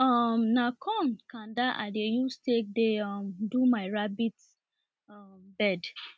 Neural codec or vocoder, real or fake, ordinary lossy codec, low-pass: none; real; none; none